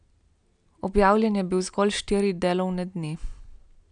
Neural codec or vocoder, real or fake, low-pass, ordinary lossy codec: none; real; 9.9 kHz; none